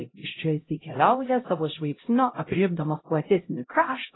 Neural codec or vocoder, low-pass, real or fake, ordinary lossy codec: codec, 16 kHz, 0.5 kbps, X-Codec, HuBERT features, trained on LibriSpeech; 7.2 kHz; fake; AAC, 16 kbps